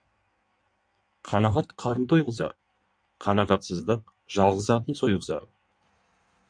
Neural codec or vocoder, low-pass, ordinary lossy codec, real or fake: codec, 16 kHz in and 24 kHz out, 1.1 kbps, FireRedTTS-2 codec; 9.9 kHz; MP3, 64 kbps; fake